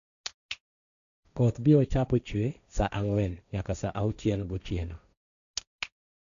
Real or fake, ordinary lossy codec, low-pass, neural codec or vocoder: fake; none; 7.2 kHz; codec, 16 kHz, 1.1 kbps, Voila-Tokenizer